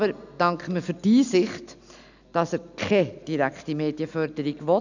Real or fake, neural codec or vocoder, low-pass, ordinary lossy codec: real; none; 7.2 kHz; none